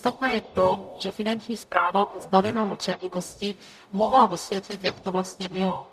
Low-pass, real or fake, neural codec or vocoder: 14.4 kHz; fake; codec, 44.1 kHz, 0.9 kbps, DAC